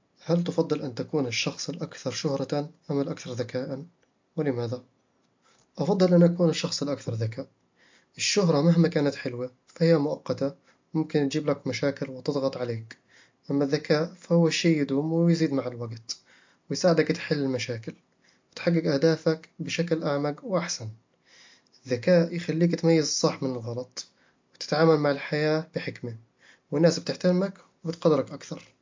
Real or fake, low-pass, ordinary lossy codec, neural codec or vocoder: real; 7.2 kHz; MP3, 48 kbps; none